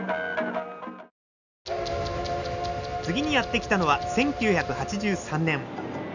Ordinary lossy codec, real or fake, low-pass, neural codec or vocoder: none; real; 7.2 kHz; none